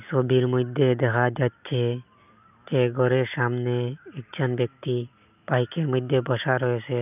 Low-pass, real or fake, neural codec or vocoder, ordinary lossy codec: 3.6 kHz; real; none; none